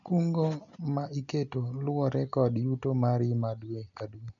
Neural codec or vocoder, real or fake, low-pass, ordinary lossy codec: none; real; 7.2 kHz; none